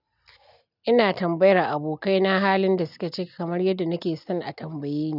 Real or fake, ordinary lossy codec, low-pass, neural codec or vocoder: real; none; 5.4 kHz; none